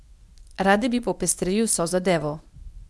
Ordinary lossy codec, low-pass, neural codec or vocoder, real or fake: none; none; codec, 24 kHz, 0.9 kbps, WavTokenizer, medium speech release version 1; fake